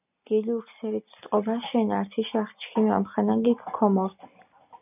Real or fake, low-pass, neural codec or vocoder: real; 3.6 kHz; none